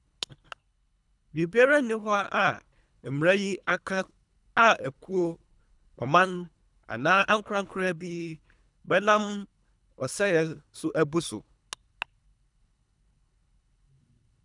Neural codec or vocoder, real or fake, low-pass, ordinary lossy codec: codec, 24 kHz, 3 kbps, HILCodec; fake; 10.8 kHz; none